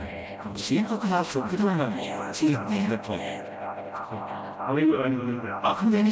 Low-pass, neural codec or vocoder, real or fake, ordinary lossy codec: none; codec, 16 kHz, 0.5 kbps, FreqCodec, smaller model; fake; none